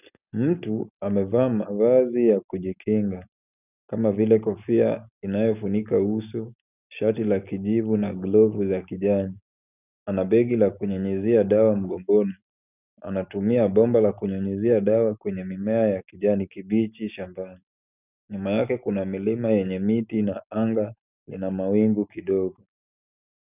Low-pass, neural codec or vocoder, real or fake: 3.6 kHz; none; real